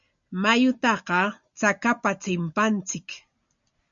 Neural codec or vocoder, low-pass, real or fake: none; 7.2 kHz; real